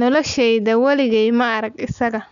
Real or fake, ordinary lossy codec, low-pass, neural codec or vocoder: real; none; 7.2 kHz; none